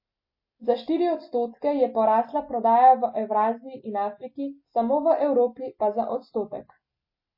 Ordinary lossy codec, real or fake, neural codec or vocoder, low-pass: MP3, 24 kbps; real; none; 5.4 kHz